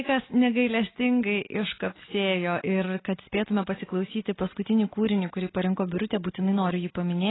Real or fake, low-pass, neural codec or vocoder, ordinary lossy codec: real; 7.2 kHz; none; AAC, 16 kbps